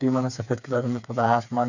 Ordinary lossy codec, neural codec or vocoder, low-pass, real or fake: none; codec, 16 kHz, 4 kbps, FreqCodec, smaller model; 7.2 kHz; fake